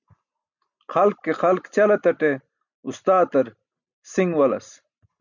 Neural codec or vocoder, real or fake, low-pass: none; real; 7.2 kHz